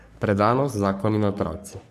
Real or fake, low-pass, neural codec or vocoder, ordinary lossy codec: fake; 14.4 kHz; codec, 44.1 kHz, 3.4 kbps, Pupu-Codec; AAC, 96 kbps